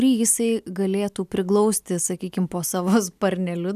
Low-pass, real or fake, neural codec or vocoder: 14.4 kHz; real; none